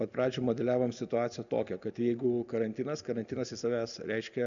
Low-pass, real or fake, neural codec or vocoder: 7.2 kHz; real; none